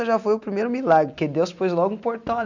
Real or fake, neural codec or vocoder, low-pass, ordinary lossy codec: real; none; 7.2 kHz; none